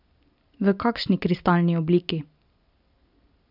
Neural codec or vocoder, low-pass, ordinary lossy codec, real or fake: none; 5.4 kHz; none; real